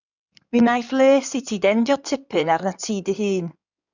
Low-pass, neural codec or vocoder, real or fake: 7.2 kHz; codec, 16 kHz in and 24 kHz out, 2.2 kbps, FireRedTTS-2 codec; fake